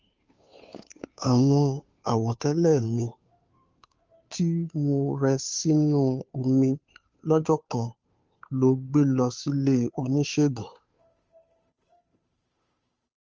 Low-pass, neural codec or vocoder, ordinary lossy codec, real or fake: 7.2 kHz; codec, 16 kHz, 2 kbps, FunCodec, trained on Chinese and English, 25 frames a second; Opus, 24 kbps; fake